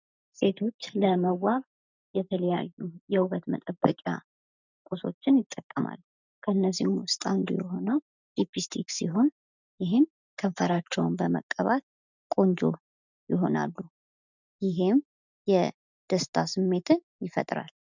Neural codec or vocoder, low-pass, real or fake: none; 7.2 kHz; real